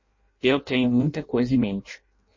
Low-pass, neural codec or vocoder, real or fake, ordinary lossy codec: 7.2 kHz; codec, 16 kHz in and 24 kHz out, 0.6 kbps, FireRedTTS-2 codec; fake; MP3, 32 kbps